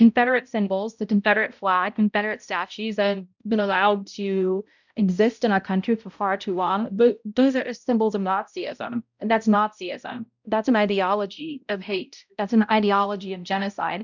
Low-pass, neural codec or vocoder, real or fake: 7.2 kHz; codec, 16 kHz, 0.5 kbps, X-Codec, HuBERT features, trained on balanced general audio; fake